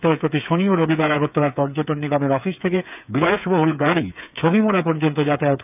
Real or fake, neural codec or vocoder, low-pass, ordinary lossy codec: fake; codec, 16 kHz, 8 kbps, FreqCodec, smaller model; 3.6 kHz; none